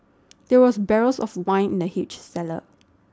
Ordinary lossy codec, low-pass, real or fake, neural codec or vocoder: none; none; real; none